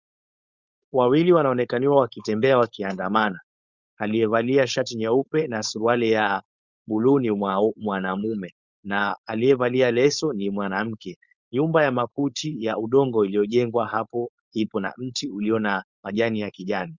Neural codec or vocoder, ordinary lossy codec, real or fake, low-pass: codec, 16 kHz, 4.8 kbps, FACodec; Opus, 64 kbps; fake; 7.2 kHz